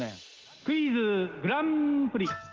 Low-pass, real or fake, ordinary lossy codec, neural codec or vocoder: 7.2 kHz; real; Opus, 16 kbps; none